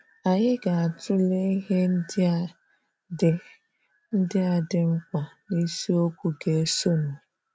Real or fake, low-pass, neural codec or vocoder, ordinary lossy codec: real; none; none; none